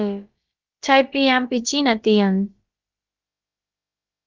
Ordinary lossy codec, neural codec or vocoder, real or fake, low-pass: Opus, 32 kbps; codec, 16 kHz, about 1 kbps, DyCAST, with the encoder's durations; fake; 7.2 kHz